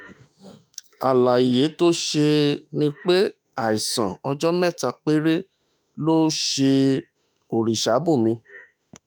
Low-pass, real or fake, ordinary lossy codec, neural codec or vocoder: none; fake; none; autoencoder, 48 kHz, 32 numbers a frame, DAC-VAE, trained on Japanese speech